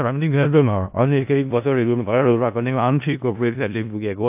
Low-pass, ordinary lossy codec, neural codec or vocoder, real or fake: 3.6 kHz; AAC, 32 kbps; codec, 16 kHz in and 24 kHz out, 0.4 kbps, LongCat-Audio-Codec, four codebook decoder; fake